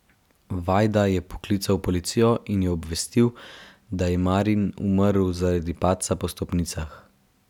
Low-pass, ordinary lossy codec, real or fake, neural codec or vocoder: 19.8 kHz; none; real; none